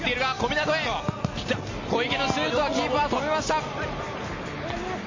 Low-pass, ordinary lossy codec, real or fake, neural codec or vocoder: 7.2 kHz; MP3, 32 kbps; real; none